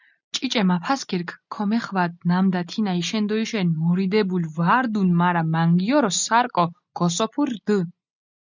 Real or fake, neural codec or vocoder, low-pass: real; none; 7.2 kHz